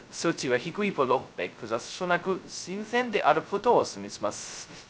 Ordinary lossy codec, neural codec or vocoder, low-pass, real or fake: none; codec, 16 kHz, 0.2 kbps, FocalCodec; none; fake